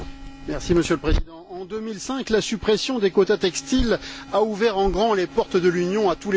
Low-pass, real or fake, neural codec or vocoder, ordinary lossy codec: none; real; none; none